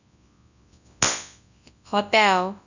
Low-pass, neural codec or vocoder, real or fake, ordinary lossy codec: 7.2 kHz; codec, 24 kHz, 0.9 kbps, WavTokenizer, large speech release; fake; none